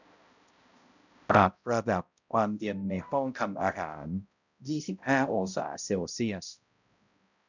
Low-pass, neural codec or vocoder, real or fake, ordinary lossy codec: 7.2 kHz; codec, 16 kHz, 0.5 kbps, X-Codec, HuBERT features, trained on balanced general audio; fake; none